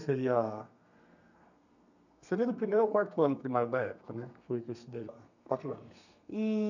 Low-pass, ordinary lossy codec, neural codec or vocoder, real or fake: 7.2 kHz; none; codec, 32 kHz, 1.9 kbps, SNAC; fake